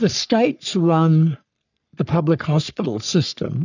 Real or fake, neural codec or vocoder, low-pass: fake; codec, 44.1 kHz, 3.4 kbps, Pupu-Codec; 7.2 kHz